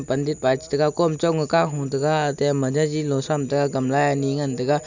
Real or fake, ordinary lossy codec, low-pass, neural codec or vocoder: real; none; 7.2 kHz; none